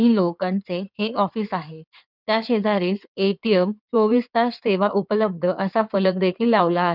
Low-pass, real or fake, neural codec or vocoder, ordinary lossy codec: 5.4 kHz; fake; codec, 16 kHz in and 24 kHz out, 2.2 kbps, FireRedTTS-2 codec; none